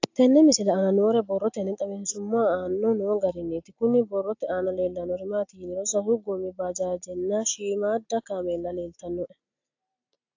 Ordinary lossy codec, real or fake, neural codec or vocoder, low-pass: AAC, 48 kbps; real; none; 7.2 kHz